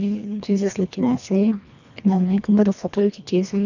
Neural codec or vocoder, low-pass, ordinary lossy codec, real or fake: codec, 24 kHz, 1.5 kbps, HILCodec; 7.2 kHz; none; fake